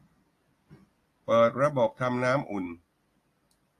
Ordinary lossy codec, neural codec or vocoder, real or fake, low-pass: AAC, 48 kbps; none; real; 14.4 kHz